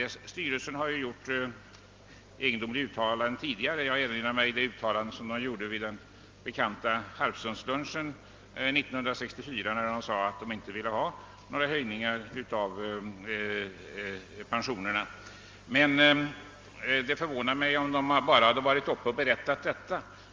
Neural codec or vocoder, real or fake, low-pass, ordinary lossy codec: none; real; 7.2 kHz; Opus, 32 kbps